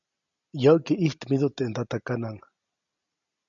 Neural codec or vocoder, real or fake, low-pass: none; real; 7.2 kHz